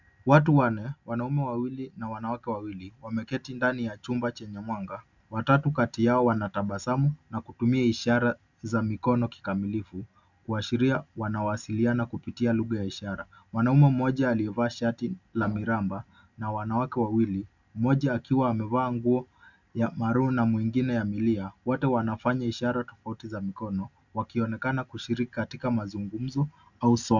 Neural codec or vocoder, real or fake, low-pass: none; real; 7.2 kHz